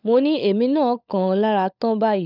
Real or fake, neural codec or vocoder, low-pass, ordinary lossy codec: fake; codec, 16 kHz, 16 kbps, FunCodec, trained on LibriTTS, 50 frames a second; 5.4 kHz; none